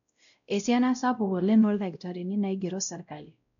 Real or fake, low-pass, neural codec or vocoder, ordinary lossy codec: fake; 7.2 kHz; codec, 16 kHz, 0.5 kbps, X-Codec, WavLM features, trained on Multilingual LibriSpeech; none